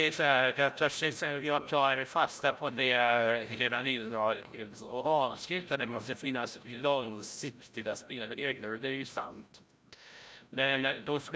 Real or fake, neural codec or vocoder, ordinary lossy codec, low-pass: fake; codec, 16 kHz, 0.5 kbps, FreqCodec, larger model; none; none